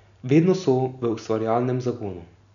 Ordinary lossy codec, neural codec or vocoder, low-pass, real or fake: none; none; 7.2 kHz; real